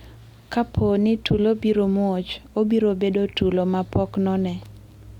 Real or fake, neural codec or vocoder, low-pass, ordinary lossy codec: real; none; 19.8 kHz; Opus, 64 kbps